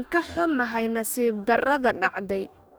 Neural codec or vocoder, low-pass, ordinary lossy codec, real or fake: codec, 44.1 kHz, 2.6 kbps, DAC; none; none; fake